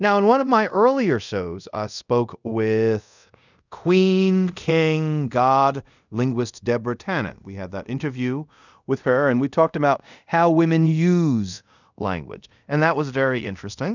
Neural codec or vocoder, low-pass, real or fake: codec, 24 kHz, 0.5 kbps, DualCodec; 7.2 kHz; fake